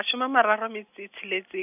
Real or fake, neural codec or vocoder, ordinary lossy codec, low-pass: real; none; none; 3.6 kHz